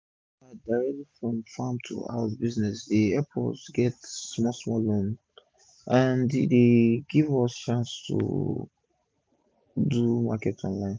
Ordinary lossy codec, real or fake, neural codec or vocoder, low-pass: none; real; none; none